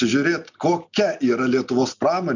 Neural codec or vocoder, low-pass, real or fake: none; 7.2 kHz; real